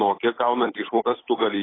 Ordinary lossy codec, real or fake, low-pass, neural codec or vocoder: AAC, 16 kbps; fake; 7.2 kHz; codec, 16 kHz, 8 kbps, FunCodec, trained on Chinese and English, 25 frames a second